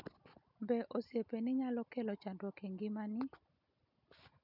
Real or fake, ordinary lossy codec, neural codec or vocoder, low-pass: real; none; none; 5.4 kHz